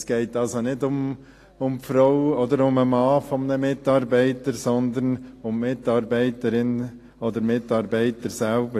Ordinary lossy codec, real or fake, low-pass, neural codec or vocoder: AAC, 48 kbps; real; 14.4 kHz; none